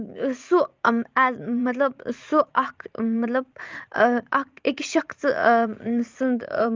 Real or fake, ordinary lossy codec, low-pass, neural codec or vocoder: fake; Opus, 32 kbps; 7.2 kHz; vocoder, 44.1 kHz, 80 mel bands, Vocos